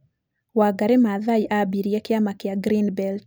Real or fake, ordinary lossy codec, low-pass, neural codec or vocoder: real; none; none; none